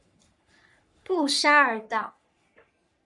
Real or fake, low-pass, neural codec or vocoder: fake; 10.8 kHz; codec, 44.1 kHz, 3.4 kbps, Pupu-Codec